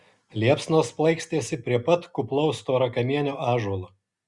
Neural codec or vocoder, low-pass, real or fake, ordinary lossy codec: none; 10.8 kHz; real; Opus, 64 kbps